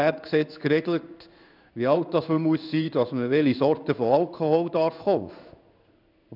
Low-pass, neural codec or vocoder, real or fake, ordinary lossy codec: 5.4 kHz; codec, 16 kHz in and 24 kHz out, 1 kbps, XY-Tokenizer; fake; none